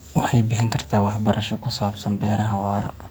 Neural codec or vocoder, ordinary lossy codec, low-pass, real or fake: codec, 44.1 kHz, 2.6 kbps, SNAC; none; none; fake